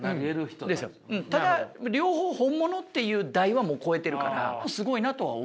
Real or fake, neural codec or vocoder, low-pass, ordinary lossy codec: real; none; none; none